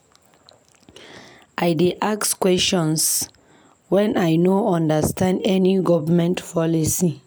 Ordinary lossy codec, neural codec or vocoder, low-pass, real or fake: none; none; none; real